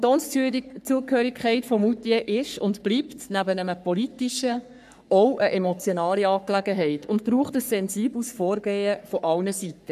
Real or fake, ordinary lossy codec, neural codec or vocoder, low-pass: fake; none; codec, 44.1 kHz, 3.4 kbps, Pupu-Codec; 14.4 kHz